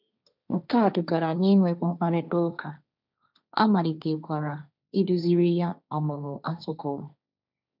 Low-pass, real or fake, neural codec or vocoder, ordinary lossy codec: 5.4 kHz; fake; codec, 16 kHz, 1.1 kbps, Voila-Tokenizer; none